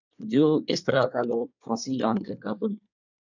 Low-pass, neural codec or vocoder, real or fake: 7.2 kHz; codec, 24 kHz, 1 kbps, SNAC; fake